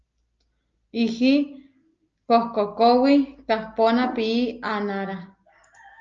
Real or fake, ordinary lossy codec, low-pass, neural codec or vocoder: real; Opus, 24 kbps; 7.2 kHz; none